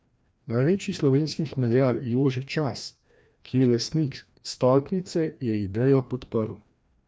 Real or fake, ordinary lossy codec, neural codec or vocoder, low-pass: fake; none; codec, 16 kHz, 1 kbps, FreqCodec, larger model; none